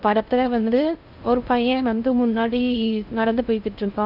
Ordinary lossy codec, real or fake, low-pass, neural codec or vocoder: none; fake; 5.4 kHz; codec, 16 kHz in and 24 kHz out, 0.6 kbps, FocalCodec, streaming, 4096 codes